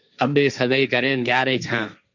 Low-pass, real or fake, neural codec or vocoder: 7.2 kHz; fake; codec, 16 kHz, 1.1 kbps, Voila-Tokenizer